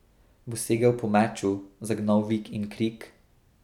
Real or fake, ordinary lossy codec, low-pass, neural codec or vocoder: real; none; 19.8 kHz; none